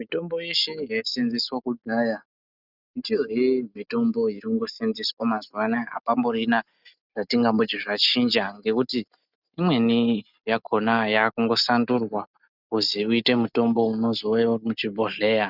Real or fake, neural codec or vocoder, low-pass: real; none; 5.4 kHz